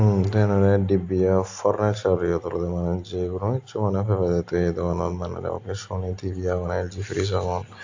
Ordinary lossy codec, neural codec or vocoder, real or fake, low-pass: AAC, 48 kbps; none; real; 7.2 kHz